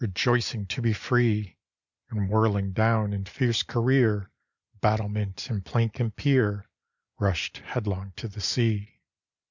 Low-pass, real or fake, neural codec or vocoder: 7.2 kHz; real; none